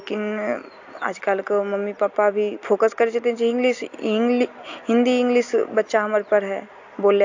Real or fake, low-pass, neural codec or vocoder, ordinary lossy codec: real; 7.2 kHz; none; AAC, 48 kbps